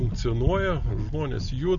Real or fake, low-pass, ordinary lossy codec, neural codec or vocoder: real; 7.2 kHz; MP3, 96 kbps; none